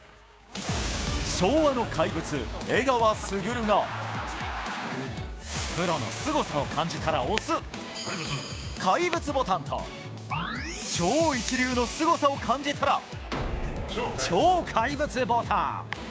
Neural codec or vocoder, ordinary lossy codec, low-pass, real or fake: codec, 16 kHz, 6 kbps, DAC; none; none; fake